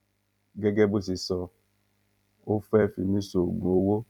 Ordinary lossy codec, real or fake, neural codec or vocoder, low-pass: none; fake; vocoder, 44.1 kHz, 128 mel bands every 256 samples, BigVGAN v2; 19.8 kHz